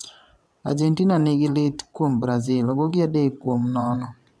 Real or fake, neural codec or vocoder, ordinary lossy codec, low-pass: fake; vocoder, 22.05 kHz, 80 mel bands, WaveNeXt; none; none